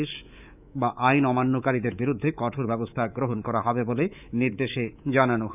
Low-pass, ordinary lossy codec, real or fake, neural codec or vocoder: 3.6 kHz; none; fake; codec, 24 kHz, 3.1 kbps, DualCodec